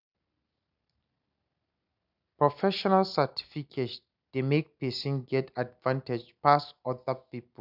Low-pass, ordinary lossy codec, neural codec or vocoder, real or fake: 5.4 kHz; none; none; real